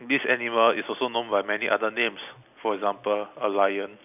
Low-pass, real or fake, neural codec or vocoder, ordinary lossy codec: 3.6 kHz; real; none; none